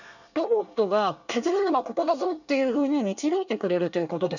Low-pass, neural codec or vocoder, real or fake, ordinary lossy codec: 7.2 kHz; codec, 24 kHz, 1 kbps, SNAC; fake; none